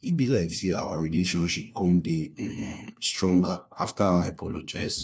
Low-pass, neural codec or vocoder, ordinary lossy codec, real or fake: none; codec, 16 kHz, 1 kbps, FunCodec, trained on LibriTTS, 50 frames a second; none; fake